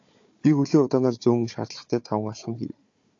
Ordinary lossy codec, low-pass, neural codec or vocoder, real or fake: AAC, 48 kbps; 7.2 kHz; codec, 16 kHz, 4 kbps, FunCodec, trained on Chinese and English, 50 frames a second; fake